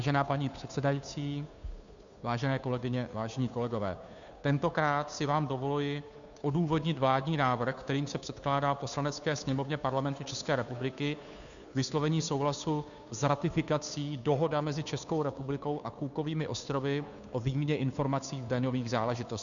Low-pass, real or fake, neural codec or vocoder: 7.2 kHz; fake; codec, 16 kHz, 2 kbps, FunCodec, trained on Chinese and English, 25 frames a second